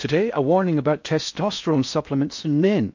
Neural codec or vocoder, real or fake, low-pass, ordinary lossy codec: codec, 16 kHz in and 24 kHz out, 0.6 kbps, FocalCodec, streaming, 4096 codes; fake; 7.2 kHz; MP3, 48 kbps